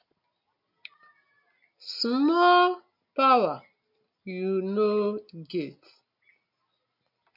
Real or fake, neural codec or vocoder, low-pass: real; none; 5.4 kHz